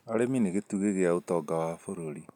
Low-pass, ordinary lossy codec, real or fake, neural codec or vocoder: 19.8 kHz; none; real; none